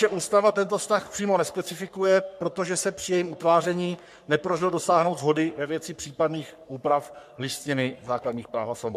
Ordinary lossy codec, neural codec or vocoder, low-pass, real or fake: MP3, 96 kbps; codec, 44.1 kHz, 3.4 kbps, Pupu-Codec; 14.4 kHz; fake